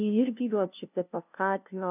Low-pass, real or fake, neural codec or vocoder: 3.6 kHz; fake; codec, 16 kHz, 0.5 kbps, FunCodec, trained on LibriTTS, 25 frames a second